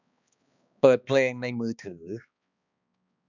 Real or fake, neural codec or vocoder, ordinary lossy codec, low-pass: fake; codec, 16 kHz, 2 kbps, X-Codec, HuBERT features, trained on balanced general audio; none; 7.2 kHz